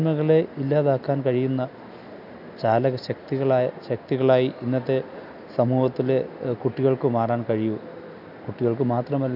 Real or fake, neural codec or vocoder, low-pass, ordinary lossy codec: real; none; 5.4 kHz; none